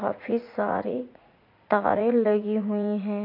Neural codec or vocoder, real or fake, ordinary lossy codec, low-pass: vocoder, 44.1 kHz, 80 mel bands, Vocos; fake; MP3, 32 kbps; 5.4 kHz